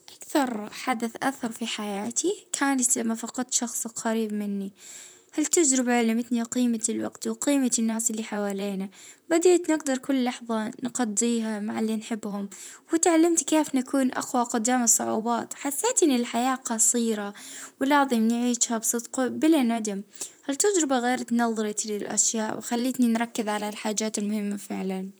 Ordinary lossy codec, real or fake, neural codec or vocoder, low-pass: none; fake; vocoder, 44.1 kHz, 128 mel bands, Pupu-Vocoder; none